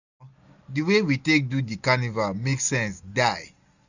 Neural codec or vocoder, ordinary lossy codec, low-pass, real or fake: none; none; 7.2 kHz; real